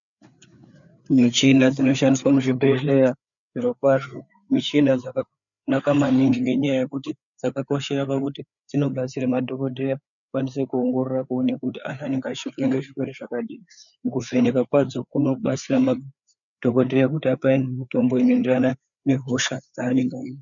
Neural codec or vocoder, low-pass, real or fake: codec, 16 kHz, 4 kbps, FreqCodec, larger model; 7.2 kHz; fake